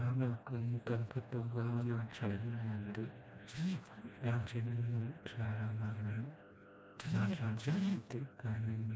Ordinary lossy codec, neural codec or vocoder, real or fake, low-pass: none; codec, 16 kHz, 1 kbps, FreqCodec, smaller model; fake; none